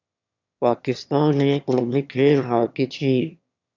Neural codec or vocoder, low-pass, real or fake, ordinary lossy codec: autoencoder, 22.05 kHz, a latent of 192 numbers a frame, VITS, trained on one speaker; 7.2 kHz; fake; MP3, 64 kbps